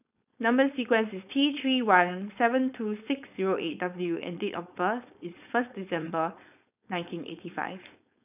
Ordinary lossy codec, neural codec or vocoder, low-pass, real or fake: none; codec, 16 kHz, 4.8 kbps, FACodec; 3.6 kHz; fake